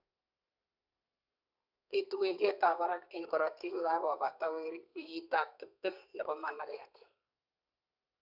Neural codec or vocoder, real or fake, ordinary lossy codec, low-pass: codec, 32 kHz, 1.9 kbps, SNAC; fake; none; 5.4 kHz